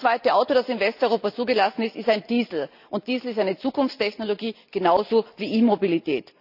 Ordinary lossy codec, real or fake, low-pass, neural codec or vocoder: none; real; 5.4 kHz; none